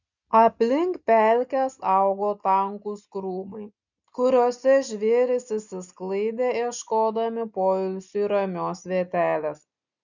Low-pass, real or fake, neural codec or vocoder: 7.2 kHz; real; none